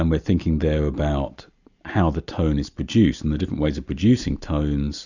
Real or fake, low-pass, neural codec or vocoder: real; 7.2 kHz; none